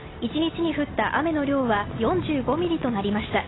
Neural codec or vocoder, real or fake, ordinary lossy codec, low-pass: none; real; AAC, 16 kbps; 7.2 kHz